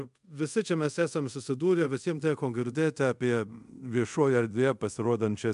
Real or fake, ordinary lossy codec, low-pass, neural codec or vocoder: fake; MP3, 64 kbps; 10.8 kHz; codec, 24 kHz, 0.5 kbps, DualCodec